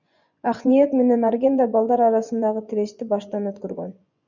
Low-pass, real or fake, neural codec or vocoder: 7.2 kHz; fake; vocoder, 24 kHz, 100 mel bands, Vocos